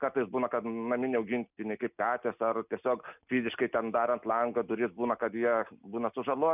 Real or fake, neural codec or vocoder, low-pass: real; none; 3.6 kHz